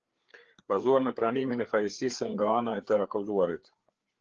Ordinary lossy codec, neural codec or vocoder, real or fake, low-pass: Opus, 16 kbps; codec, 16 kHz, 4 kbps, FreqCodec, larger model; fake; 7.2 kHz